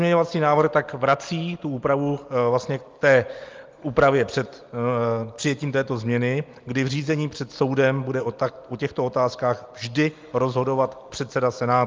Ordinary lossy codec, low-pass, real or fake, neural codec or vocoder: Opus, 24 kbps; 7.2 kHz; real; none